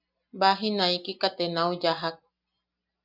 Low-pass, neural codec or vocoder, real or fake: 5.4 kHz; none; real